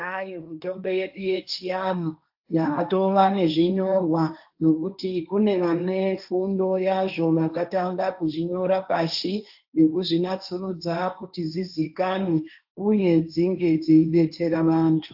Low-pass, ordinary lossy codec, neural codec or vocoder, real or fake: 5.4 kHz; AAC, 48 kbps; codec, 16 kHz, 1.1 kbps, Voila-Tokenizer; fake